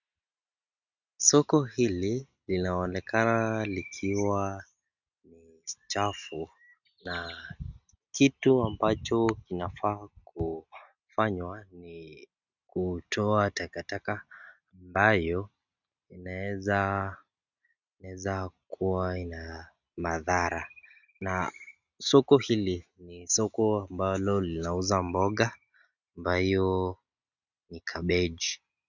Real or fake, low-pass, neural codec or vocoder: real; 7.2 kHz; none